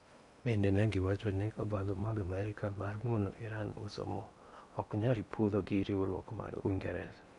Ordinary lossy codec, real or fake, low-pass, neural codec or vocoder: none; fake; 10.8 kHz; codec, 16 kHz in and 24 kHz out, 0.8 kbps, FocalCodec, streaming, 65536 codes